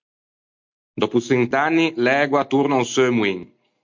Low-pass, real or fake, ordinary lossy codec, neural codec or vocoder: 7.2 kHz; real; MP3, 48 kbps; none